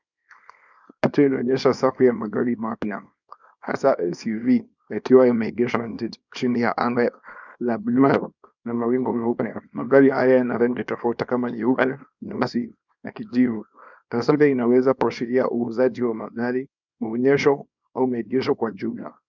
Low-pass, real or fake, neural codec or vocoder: 7.2 kHz; fake; codec, 24 kHz, 0.9 kbps, WavTokenizer, small release